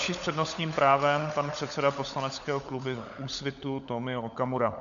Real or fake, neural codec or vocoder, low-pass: fake; codec, 16 kHz, 16 kbps, FunCodec, trained on LibriTTS, 50 frames a second; 7.2 kHz